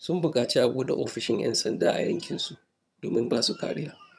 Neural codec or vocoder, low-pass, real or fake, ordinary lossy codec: vocoder, 22.05 kHz, 80 mel bands, HiFi-GAN; none; fake; none